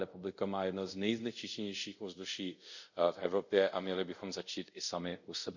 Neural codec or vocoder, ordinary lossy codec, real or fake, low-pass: codec, 24 kHz, 0.5 kbps, DualCodec; none; fake; 7.2 kHz